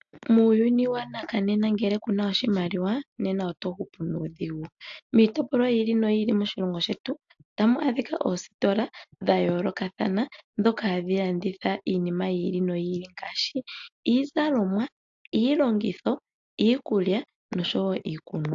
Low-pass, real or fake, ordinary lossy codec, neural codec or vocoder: 7.2 kHz; real; AAC, 64 kbps; none